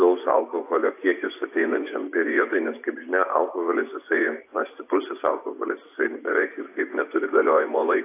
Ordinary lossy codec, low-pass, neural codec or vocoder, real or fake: AAC, 24 kbps; 3.6 kHz; vocoder, 24 kHz, 100 mel bands, Vocos; fake